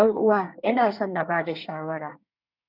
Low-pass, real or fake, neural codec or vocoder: 5.4 kHz; fake; codec, 24 kHz, 1 kbps, SNAC